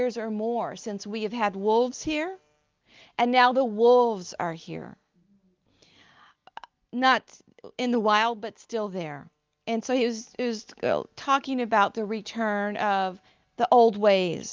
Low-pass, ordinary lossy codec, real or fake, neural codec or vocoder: 7.2 kHz; Opus, 24 kbps; real; none